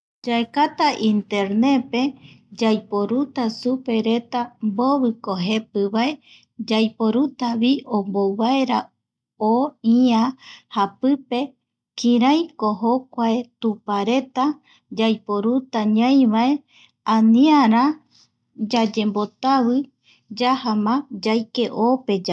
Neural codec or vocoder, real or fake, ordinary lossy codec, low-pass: none; real; none; none